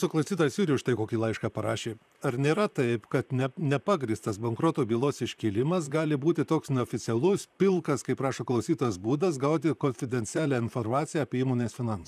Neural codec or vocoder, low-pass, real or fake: vocoder, 44.1 kHz, 128 mel bands, Pupu-Vocoder; 14.4 kHz; fake